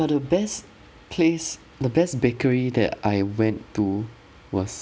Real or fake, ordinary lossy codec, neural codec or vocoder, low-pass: real; none; none; none